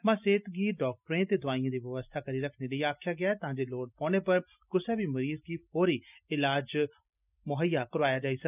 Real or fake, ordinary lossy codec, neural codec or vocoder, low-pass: real; none; none; 3.6 kHz